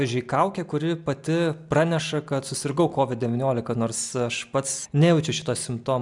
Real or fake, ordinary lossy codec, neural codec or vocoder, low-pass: real; MP3, 96 kbps; none; 10.8 kHz